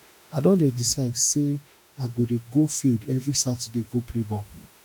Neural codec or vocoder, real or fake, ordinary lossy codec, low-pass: autoencoder, 48 kHz, 32 numbers a frame, DAC-VAE, trained on Japanese speech; fake; none; none